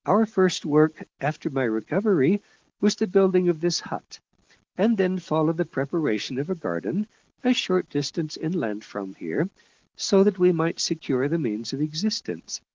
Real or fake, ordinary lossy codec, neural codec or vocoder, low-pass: real; Opus, 16 kbps; none; 7.2 kHz